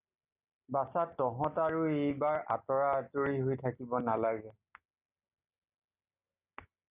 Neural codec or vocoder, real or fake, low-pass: none; real; 3.6 kHz